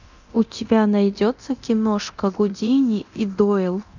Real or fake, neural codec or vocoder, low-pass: fake; codec, 24 kHz, 0.9 kbps, DualCodec; 7.2 kHz